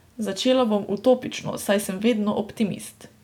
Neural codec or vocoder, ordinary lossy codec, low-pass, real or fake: none; none; 19.8 kHz; real